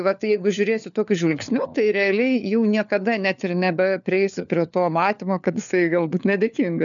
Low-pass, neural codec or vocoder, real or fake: 7.2 kHz; codec, 16 kHz, 4 kbps, FunCodec, trained on LibriTTS, 50 frames a second; fake